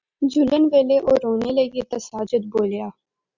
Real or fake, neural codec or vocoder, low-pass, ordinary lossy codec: real; none; 7.2 kHz; Opus, 64 kbps